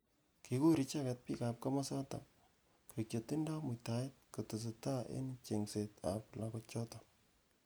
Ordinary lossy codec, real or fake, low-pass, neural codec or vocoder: none; real; none; none